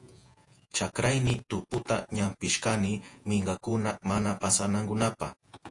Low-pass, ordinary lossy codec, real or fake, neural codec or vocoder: 10.8 kHz; AAC, 48 kbps; fake; vocoder, 48 kHz, 128 mel bands, Vocos